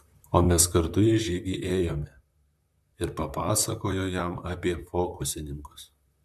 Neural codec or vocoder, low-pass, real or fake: vocoder, 44.1 kHz, 128 mel bands, Pupu-Vocoder; 14.4 kHz; fake